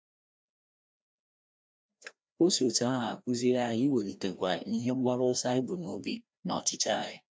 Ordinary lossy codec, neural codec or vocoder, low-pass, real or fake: none; codec, 16 kHz, 1 kbps, FreqCodec, larger model; none; fake